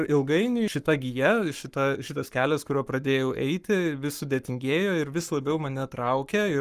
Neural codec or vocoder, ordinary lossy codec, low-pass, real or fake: codec, 44.1 kHz, 7.8 kbps, Pupu-Codec; Opus, 32 kbps; 14.4 kHz; fake